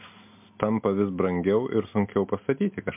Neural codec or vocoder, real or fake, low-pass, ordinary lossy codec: none; real; 3.6 kHz; MP3, 32 kbps